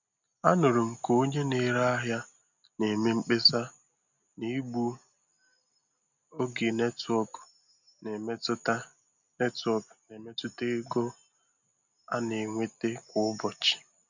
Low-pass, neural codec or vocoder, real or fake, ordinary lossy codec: 7.2 kHz; none; real; none